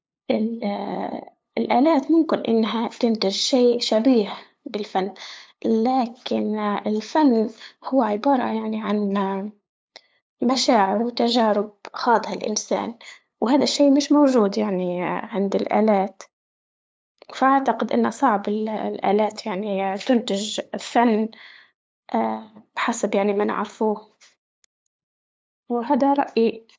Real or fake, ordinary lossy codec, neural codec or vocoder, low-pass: fake; none; codec, 16 kHz, 8 kbps, FunCodec, trained on LibriTTS, 25 frames a second; none